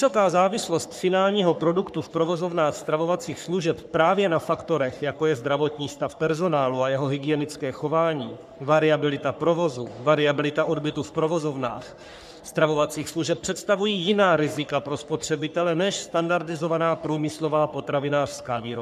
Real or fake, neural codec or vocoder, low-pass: fake; codec, 44.1 kHz, 3.4 kbps, Pupu-Codec; 14.4 kHz